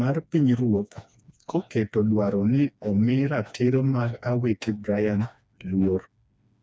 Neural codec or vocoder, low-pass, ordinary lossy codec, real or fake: codec, 16 kHz, 2 kbps, FreqCodec, smaller model; none; none; fake